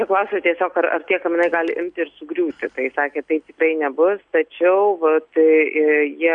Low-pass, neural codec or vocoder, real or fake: 9.9 kHz; none; real